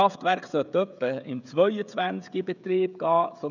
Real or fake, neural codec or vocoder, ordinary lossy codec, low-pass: fake; codec, 16 kHz, 16 kbps, FreqCodec, smaller model; none; 7.2 kHz